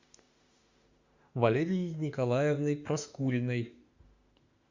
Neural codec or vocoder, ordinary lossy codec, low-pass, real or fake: autoencoder, 48 kHz, 32 numbers a frame, DAC-VAE, trained on Japanese speech; Opus, 64 kbps; 7.2 kHz; fake